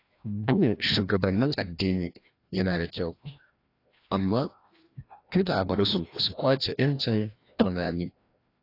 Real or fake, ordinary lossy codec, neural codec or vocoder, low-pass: fake; AAC, 32 kbps; codec, 16 kHz, 1 kbps, FreqCodec, larger model; 5.4 kHz